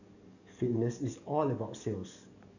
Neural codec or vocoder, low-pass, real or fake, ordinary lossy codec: codec, 44.1 kHz, 7.8 kbps, DAC; 7.2 kHz; fake; none